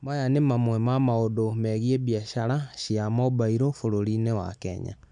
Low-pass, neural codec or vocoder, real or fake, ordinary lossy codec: 9.9 kHz; none; real; none